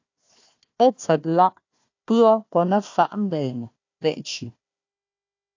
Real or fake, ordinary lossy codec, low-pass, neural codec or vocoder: fake; AAC, 48 kbps; 7.2 kHz; codec, 16 kHz, 1 kbps, FunCodec, trained on Chinese and English, 50 frames a second